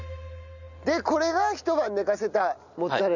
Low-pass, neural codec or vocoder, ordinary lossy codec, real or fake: 7.2 kHz; none; none; real